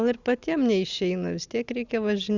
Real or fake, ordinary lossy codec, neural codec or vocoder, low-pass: real; Opus, 64 kbps; none; 7.2 kHz